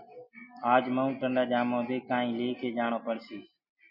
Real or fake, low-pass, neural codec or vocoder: real; 5.4 kHz; none